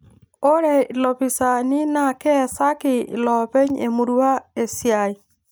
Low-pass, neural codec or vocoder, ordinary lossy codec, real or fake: none; none; none; real